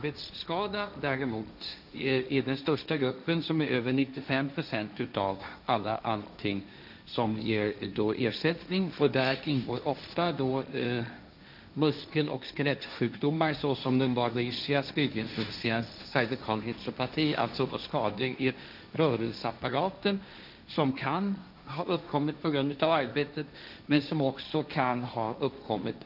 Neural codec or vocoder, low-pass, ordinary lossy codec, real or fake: codec, 16 kHz, 1.1 kbps, Voila-Tokenizer; 5.4 kHz; none; fake